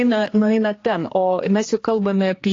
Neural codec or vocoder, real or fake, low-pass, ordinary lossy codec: codec, 16 kHz, 2 kbps, X-Codec, HuBERT features, trained on general audio; fake; 7.2 kHz; AAC, 32 kbps